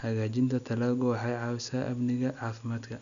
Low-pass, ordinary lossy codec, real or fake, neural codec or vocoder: 7.2 kHz; none; real; none